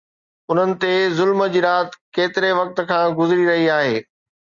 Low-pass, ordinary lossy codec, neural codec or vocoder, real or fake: 7.2 kHz; Opus, 64 kbps; none; real